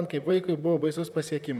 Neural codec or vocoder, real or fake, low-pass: vocoder, 44.1 kHz, 128 mel bands, Pupu-Vocoder; fake; 19.8 kHz